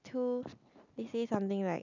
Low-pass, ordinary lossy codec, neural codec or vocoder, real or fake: 7.2 kHz; none; none; real